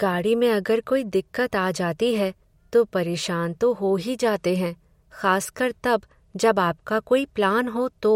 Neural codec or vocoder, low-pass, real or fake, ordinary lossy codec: none; 19.8 kHz; real; MP3, 64 kbps